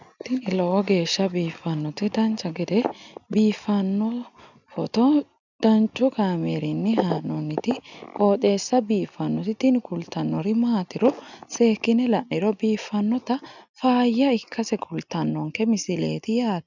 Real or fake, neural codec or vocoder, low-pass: real; none; 7.2 kHz